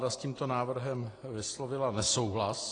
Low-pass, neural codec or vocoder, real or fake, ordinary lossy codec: 9.9 kHz; none; real; AAC, 32 kbps